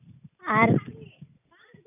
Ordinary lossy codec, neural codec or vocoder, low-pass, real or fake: none; none; 3.6 kHz; real